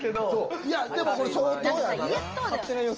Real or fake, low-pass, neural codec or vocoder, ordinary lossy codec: real; 7.2 kHz; none; Opus, 24 kbps